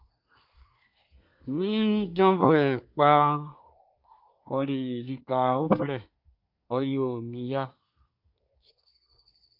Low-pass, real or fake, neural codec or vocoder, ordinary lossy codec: 5.4 kHz; fake; codec, 24 kHz, 1 kbps, SNAC; AAC, 48 kbps